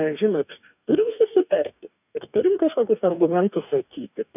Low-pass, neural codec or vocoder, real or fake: 3.6 kHz; codec, 44.1 kHz, 2.6 kbps, DAC; fake